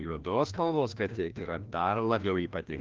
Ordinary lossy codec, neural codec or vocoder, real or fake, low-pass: Opus, 32 kbps; codec, 16 kHz, 1 kbps, FreqCodec, larger model; fake; 7.2 kHz